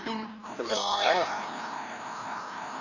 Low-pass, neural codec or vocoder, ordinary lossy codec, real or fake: 7.2 kHz; codec, 16 kHz, 1 kbps, FreqCodec, larger model; none; fake